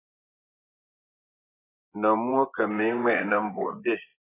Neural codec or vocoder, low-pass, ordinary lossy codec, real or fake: vocoder, 24 kHz, 100 mel bands, Vocos; 3.6 kHz; AAC, 16 kbps; fake